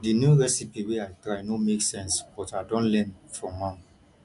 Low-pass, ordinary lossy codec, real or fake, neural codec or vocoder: 10.8 kHz; none; real; none